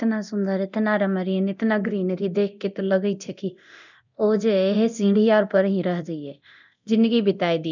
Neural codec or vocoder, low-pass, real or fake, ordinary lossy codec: codec, 24 kHz, 0.9 kbps, DualCodec; 7.2 kHz; fake; none